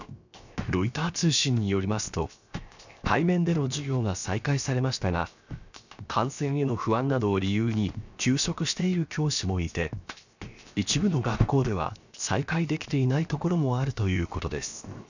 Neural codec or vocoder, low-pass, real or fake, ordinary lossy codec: codec, 16 kHz, 0.7 kbps, FocalCodec; 7.2 kHz; fake; none